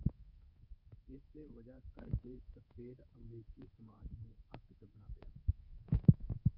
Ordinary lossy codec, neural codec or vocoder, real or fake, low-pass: Opus, 64 kbps; codec, 24 kHz, 3.1 kbps, DualCodec; fake; 5.4 kHz